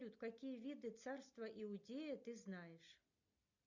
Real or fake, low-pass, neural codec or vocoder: real; 7.2 kHz; none